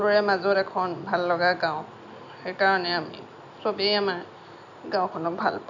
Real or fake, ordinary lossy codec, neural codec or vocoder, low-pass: real; none; none; 7.2 kHz